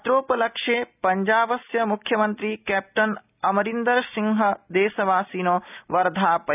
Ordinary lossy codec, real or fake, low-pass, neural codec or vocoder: none; real; 3.6 kHz; none